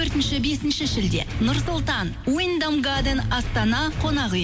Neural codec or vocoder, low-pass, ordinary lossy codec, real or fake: none; none; none; real